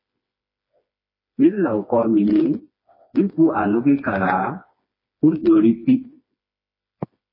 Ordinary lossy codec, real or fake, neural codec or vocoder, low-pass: MP3, 24 kbps; fake; codec, 16 kHz, 2 kbps, FreqCodec, smaller model; 5.4 kHz